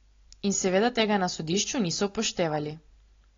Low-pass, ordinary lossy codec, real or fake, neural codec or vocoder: 7.2 kHz; AAC, 32 kbps; real; none